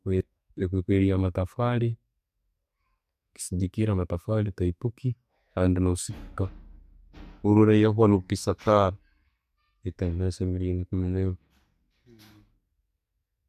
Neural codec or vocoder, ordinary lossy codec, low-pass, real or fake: codec, 44.1 kHz, 2.6 kbps, SNAC; MP3, 96 kbps; 14.4 kHz; fake